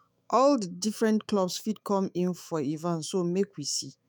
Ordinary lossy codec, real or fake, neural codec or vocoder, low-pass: none; fake; autoencoder, 48 kHz, 128 numbers a frame, DAC-VAE, trained on Japanese speech; none